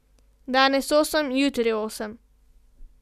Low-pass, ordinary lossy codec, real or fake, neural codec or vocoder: 14.4 kHz; none; real; none